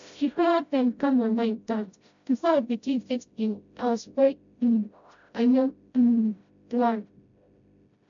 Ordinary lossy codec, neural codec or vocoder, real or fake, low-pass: MP3, 64 kbps; codec, 16 kHz, 0.5 kbps, FreqCodec, smaller model; fake; 7.2 kHz